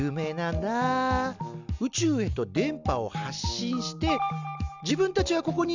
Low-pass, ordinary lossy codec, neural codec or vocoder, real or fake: 7.2 kHz; none; none; real